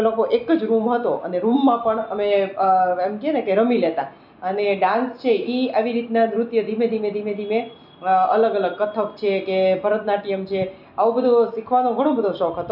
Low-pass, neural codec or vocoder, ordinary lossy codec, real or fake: 5.4 kHz; none; none; real